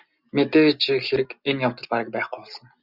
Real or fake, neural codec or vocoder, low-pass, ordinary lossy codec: real; none; 5.4 kHz; Opus, 64 kbps